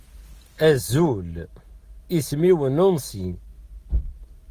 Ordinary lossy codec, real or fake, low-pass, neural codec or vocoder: Opus, 24 kbps; real; 14.4 kHz; none